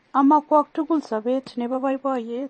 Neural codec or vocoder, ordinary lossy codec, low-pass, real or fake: vocoder, 44.1 kHz, 128 mel bands every 512 samples, BigVGAN v2; MP3, 32 kbps; 10.8 kHz; fake